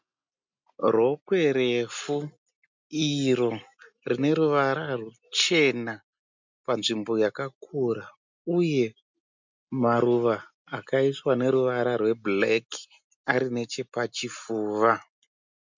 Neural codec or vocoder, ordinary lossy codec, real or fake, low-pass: none; MP3, 64 kbps; real; 7.2 kHz